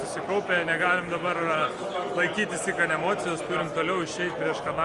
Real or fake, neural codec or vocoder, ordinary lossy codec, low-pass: real; none; Opus, 32 kbps; 10.8 kHz